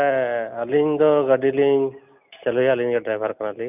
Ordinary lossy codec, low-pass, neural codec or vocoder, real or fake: none; 3.6 kHz; none; real